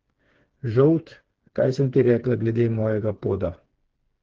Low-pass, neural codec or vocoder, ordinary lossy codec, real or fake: 7.2 kHz; codec, 16 kHz, 4 kbps, FreqCodec, smaller model; Opus, 16 kbps; fake